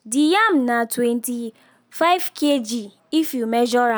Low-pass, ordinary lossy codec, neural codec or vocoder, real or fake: none; none; none; real